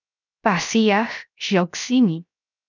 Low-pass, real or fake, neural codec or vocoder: 7.2 kHz; fake; codec, 16 kHz, 0.7 kbps, FocalCodec